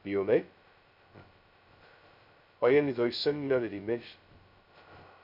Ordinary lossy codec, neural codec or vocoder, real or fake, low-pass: none; codec, 16 kHz, 0.2 kbps, FocalCodec; fake; 5.4 kHz